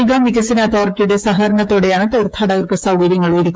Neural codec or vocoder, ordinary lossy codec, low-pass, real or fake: codec, 16 kHz, 8 kbps, FreqCodec, smaller model; none; none; fake